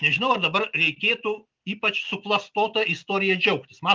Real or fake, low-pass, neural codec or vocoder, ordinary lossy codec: real; 7.2 kHz; none; Opus, 32 kbps